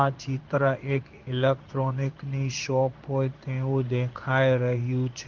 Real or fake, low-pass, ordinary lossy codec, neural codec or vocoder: fake; 7.2 kHz; Opus, 24 kbps; codec, 16 kHz in and 24 kHz out, 1 kbps, XY-Tokenizer